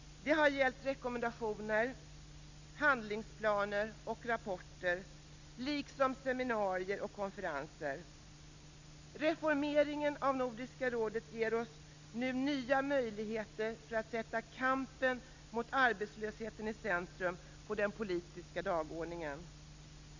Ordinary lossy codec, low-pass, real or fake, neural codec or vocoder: Opus, 64 kbps; 7.2 kHz; real; none